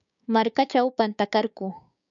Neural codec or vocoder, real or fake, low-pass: codec, 16 kHz, 6 kbps, DAC; fake; 7.2 kHz